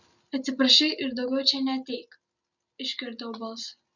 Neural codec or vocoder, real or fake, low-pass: none; real; 7.2 kHz